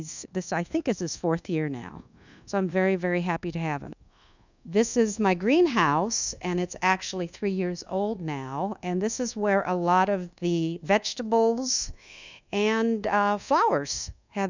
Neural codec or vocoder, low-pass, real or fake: codec, 24 kHz, 1.2 kbps, DualCodec; 7.2 kHz; fake